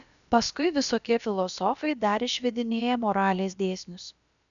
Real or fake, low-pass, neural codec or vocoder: fake; 7.2 kHz; codec, 16 kHz, about 1 kbps, DyCAST, with the encoder's durations